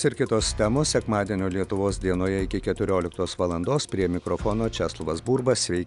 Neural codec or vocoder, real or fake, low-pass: none; real; 10.8 kHz